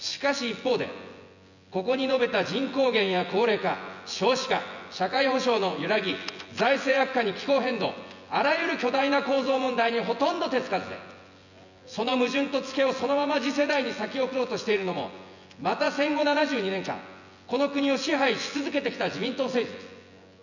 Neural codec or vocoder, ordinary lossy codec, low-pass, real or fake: vocoder, 24 kHz, 100 mel bands, Vocos; none; 7.2 kHz; fake